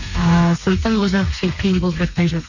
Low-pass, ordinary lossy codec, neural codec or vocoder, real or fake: 7.2 kHz; none; codec, 32 kHz, 1.9 kbps, SNAC; fake